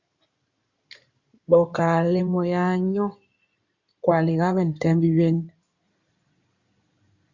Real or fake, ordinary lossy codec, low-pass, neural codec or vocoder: fake; Opus, 64 kbps; 7.2 kHz; codec, 16 kHz in and 24 kHz out, 2.2 kbps, FireRedTTS-2 codec